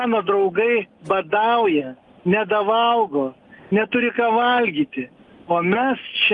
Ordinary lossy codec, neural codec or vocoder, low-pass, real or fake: Opus, 32 kbps; none; 10.8 kHz; real